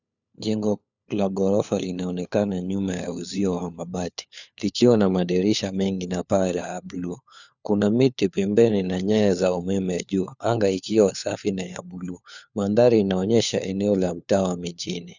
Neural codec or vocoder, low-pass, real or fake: codec, 16 kHz, 4 kbps, FunCodec, trained on LibriTTS, 50 frames a second; 7.2 kHz; fake